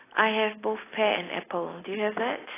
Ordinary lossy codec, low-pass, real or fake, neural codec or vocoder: AAC, 16 kbps; 3.6 kHz; real; none